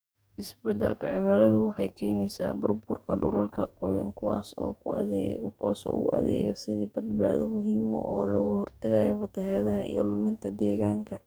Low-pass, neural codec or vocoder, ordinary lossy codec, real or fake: none; codec, 44.1 kHz, 2.6 kbps, DAC; none; fake